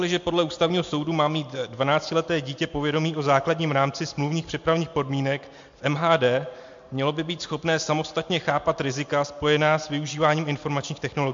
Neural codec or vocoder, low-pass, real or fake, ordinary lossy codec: none; 7.2 kHz; real; MP3, 48 kbps